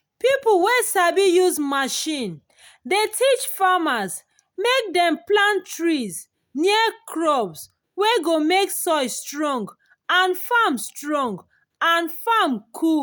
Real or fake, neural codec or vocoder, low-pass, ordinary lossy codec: real; none; none; none